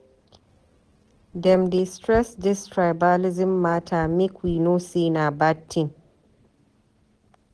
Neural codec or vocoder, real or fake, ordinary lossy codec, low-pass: none; real; Opus, 16 kbps; 10.8 kHz